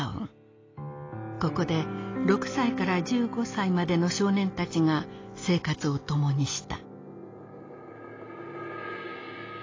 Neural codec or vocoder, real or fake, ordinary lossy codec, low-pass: none; real; AAC, 32 kbps; 7.2 kHz